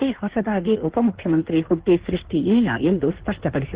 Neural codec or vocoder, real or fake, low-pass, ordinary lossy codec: codec, 16 kHz in and 24 kHz out, 1.1 kbps, FireRedTTS-2 codec; fake; 3.6 kHz; Opus, 16 kbps